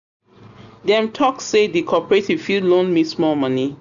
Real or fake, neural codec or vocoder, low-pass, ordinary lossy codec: real; none; 7.2 kHz; none